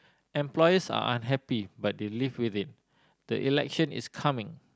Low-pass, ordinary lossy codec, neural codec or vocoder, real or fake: none; none; none; real